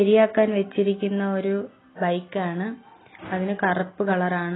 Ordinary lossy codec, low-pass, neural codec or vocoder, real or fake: AAC, 16 kbps; 7.2 kHz; none; real